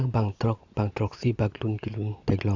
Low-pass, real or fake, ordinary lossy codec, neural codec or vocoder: 7.2 kHz; real; AAC, 48 kbps; none